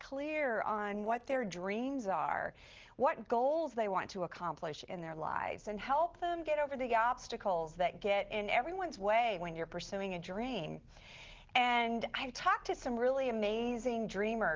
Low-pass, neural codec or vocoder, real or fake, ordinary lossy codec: 7.2 kHz; none; real; Opus, 16 kbps